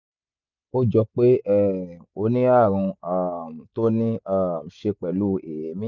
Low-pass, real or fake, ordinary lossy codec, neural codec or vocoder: 7.2 kHz; real; none; none